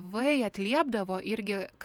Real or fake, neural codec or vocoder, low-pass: fake; vocoder, 48 kHz, 128 mel bands, Vocos; 19.8 kHz